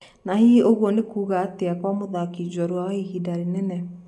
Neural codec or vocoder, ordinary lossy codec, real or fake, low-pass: none; none; real; none